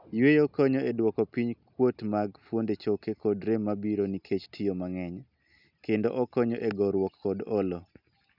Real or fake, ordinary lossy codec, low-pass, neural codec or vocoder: real; none; 5.4 kHz; none